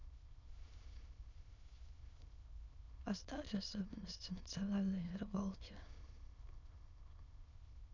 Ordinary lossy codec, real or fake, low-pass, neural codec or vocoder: none; fake; 7.2 kHz; autoencoder, 22.05 kHz, a latent of 192 numbers a frame, VITS, trained on many speakers